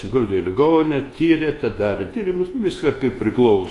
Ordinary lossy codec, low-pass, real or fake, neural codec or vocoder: AAC, 32 kbps; 10.8 kHz; fake; codec, 24 kHz, 1.2 kbps, DualCodec